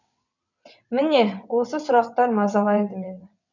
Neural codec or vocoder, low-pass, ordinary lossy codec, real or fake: vocoder, 22.05 kHz, 80 mel bands, WaveNeXt; 7.2 kHz; none; fake